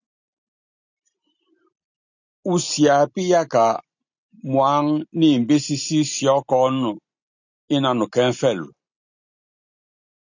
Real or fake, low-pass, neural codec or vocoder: real; 7.2 kHz; none